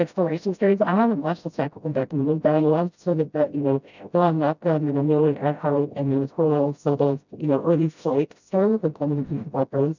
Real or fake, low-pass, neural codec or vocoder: fake; 7.2 kHz; codec, 16 kHz, 0.5 kbps, FreqCodec, smaller model